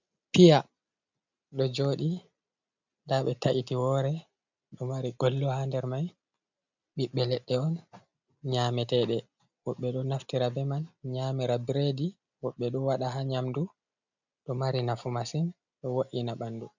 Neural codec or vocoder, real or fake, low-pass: none; real; 7.2 kHz